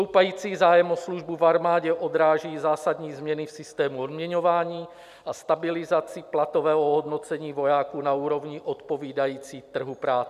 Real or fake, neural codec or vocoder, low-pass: real; none; 14.4 kHz